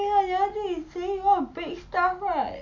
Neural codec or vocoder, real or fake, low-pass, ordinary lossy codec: none; real; 7.2 kHz; none